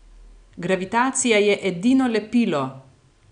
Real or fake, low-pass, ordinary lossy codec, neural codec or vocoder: real; 9.9 kHz; none; none